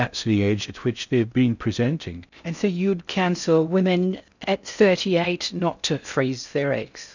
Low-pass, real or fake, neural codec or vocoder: 7.2 kHz; fake; codec, 16 kHz in and 24 kHz out, 0.6 kbps, FocalCodec, streaming, 4096 codes